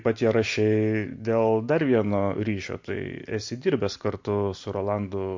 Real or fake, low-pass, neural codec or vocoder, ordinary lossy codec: real; 7.2 kHz; none; MP3, 48 kbps